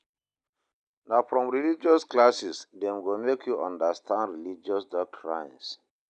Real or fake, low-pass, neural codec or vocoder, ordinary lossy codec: real; 9.9 kHz; none; none